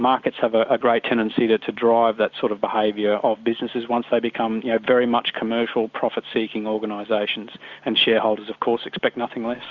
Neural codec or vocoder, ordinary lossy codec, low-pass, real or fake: none; Opus, 64 kbps; 7.2 kHz; real